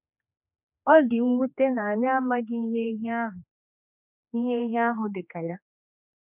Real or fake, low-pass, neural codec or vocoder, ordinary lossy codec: fake; 3.6 kHz; codec, 16 kHz, 2 kbps, X-Codec, HuBERT features, trained on general audio; none